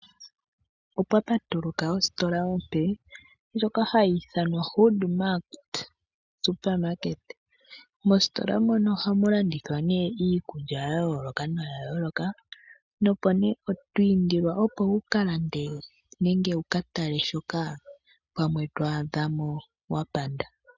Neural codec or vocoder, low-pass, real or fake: none; 7.2 kHz; real